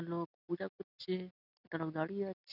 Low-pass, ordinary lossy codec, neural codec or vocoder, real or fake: 5.4 kHz; none; none; real